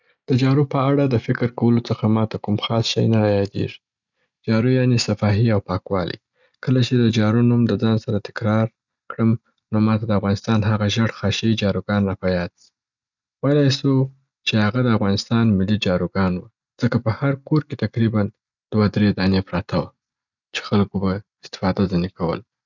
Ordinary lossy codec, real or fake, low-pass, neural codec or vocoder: none; real; 7.2 kHz; none